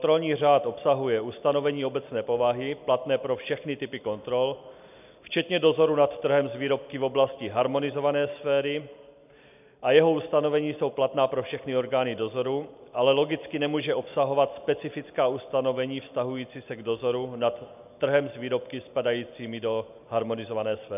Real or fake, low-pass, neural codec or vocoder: real; 3.6 kHz; none